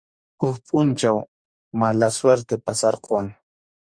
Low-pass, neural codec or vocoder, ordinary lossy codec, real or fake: 9.9 kHz; codec, 44.1 kHz, 2.6 kbps, DAC; Opus, 64 kbps; fake